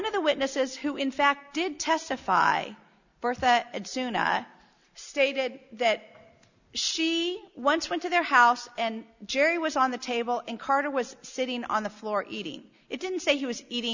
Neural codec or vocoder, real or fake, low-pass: none; real; 7.2 kHz